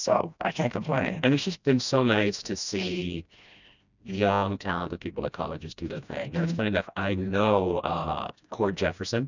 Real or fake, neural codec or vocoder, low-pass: fake; codec, 16 kHz, 1 kbps, FreqCodec, smaller model; 7.2 kHz